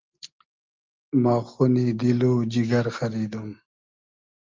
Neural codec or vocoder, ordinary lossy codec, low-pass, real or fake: none; Opus, 24 kbps; 7.2 kHz; real